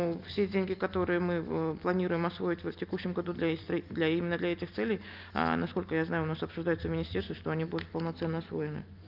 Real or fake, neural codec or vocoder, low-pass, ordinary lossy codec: real; none; 5.4 kHz; Opus, 24 kbps